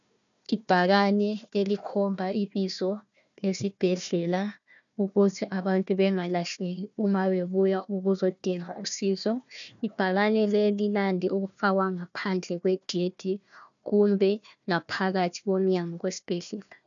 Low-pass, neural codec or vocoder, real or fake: 7.2 kHz; codec, 16 kHz, 1 kbps, FunCodec, trained on Chinese and English, 50 frames a second; fake